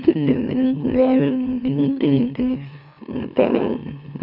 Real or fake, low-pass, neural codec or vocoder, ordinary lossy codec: fake; 5.4 kHz; autoencoder, 44.1 kHz, a latent of 192 numbers a frame, MeloTTS; none